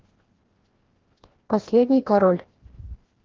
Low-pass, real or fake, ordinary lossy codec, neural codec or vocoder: 7.2 kHz; fake; Opus, 16 kbps; codec, 16 kHz, 1 kbps, FreqCodec, larger model